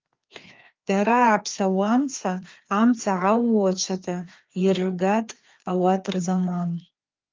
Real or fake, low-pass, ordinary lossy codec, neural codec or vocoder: fake; 7.2 kHz; Opus, 32 kbps; codec, 16 kHz, 2 kbps, FreqCodec, larger model